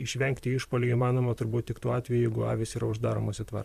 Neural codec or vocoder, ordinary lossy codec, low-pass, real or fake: vocoder, 44.1 kHz, 128 mel bands, Pupu-Vocoder; MP3, 96 kbps; 14.4 kHz; fake